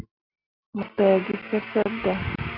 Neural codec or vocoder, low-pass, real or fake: none; 5.4 kHz; real